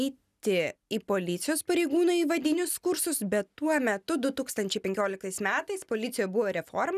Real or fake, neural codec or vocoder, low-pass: real; none; 14.4 kHz